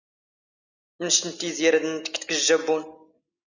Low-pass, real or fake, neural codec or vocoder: 7.2 kHz; real; none